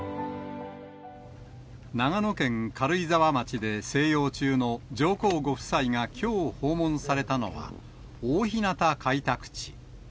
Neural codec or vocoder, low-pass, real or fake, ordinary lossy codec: none; none; real; none